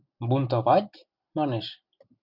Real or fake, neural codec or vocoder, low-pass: real; none; 5.4 kHz